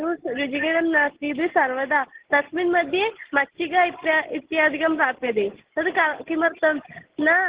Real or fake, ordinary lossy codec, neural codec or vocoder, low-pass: real; Opus, 16 kbps; none; 3.6 kHz